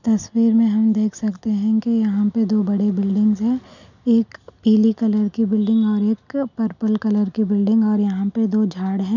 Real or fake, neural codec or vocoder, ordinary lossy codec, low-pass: real; none; none; 7.2 kHz